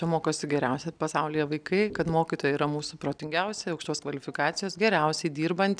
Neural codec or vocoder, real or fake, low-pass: none; real; 9.9 kHz